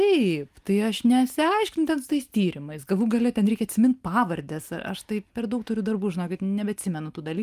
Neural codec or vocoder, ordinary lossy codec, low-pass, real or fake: none; Opus, 32 kbps; 14.4 kHz; real